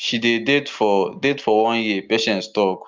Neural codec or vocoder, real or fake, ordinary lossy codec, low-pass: none; real; Opus, 24 kbps; 7.2 kHz